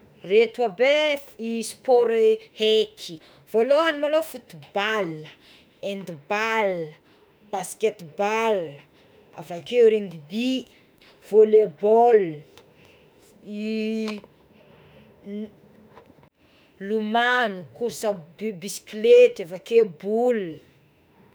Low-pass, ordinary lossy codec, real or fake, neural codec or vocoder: none; none; fake; autoencoder, 48 kHz, 32 numbers a frame, DAC-VAE, trained on Japanese speech